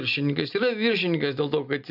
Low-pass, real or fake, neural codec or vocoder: 5.4 kHz; real; none